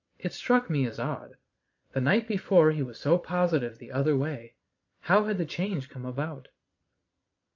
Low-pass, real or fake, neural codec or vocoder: 7.2 kHz; fake; vocoder, 22.05 kHz, 80 mel bands, Vocos